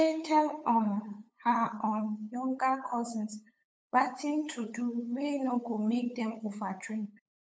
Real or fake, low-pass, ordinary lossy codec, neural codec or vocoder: fake; none; none; codec, 16 kHz, 8 kbps, FunCodec, trained on LibriTTS, 25 frames a second